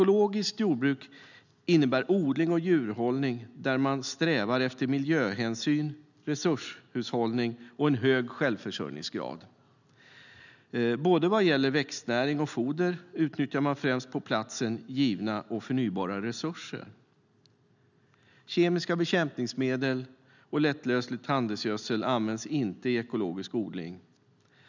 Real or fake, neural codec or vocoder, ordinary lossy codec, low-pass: real; none; none; 7.2 kHz